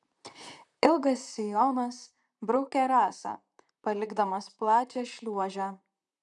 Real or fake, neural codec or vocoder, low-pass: fake; vocoder, 44.1 kHz, 128 mel bands, Pupu-Vocoder; 10.8 kHz